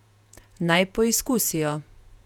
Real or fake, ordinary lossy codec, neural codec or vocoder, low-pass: fake; none; vocoder, 48 kHz, 128 mel bands, Vocos; 19.8 kHz